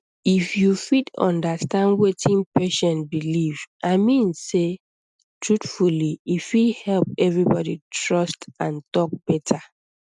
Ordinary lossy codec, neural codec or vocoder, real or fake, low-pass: none; none; real; 10.8 kHz